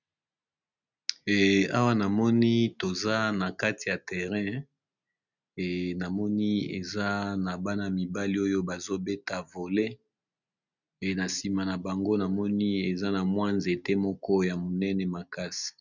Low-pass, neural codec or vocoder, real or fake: 7.2 kHz; none; real